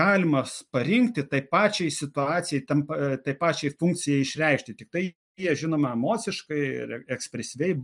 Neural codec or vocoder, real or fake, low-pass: none; real; 10.8 kHz